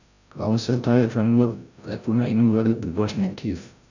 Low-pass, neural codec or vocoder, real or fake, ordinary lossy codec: 7.2 kHz; codec, 16 kHz, 0.5 kbps, FreqCodec, larger model; fake; none